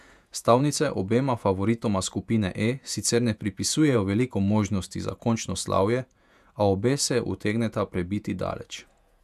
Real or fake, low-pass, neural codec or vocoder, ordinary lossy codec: fake; 14.4 kHz; vocoder, 48 kHz, 128 mel bands, Vocos; none